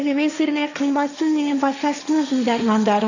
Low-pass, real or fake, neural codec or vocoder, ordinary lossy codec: 7.2 kHz; fake; codec, 16 kHz, 1.1 kbps, Voila-Tokenizer; none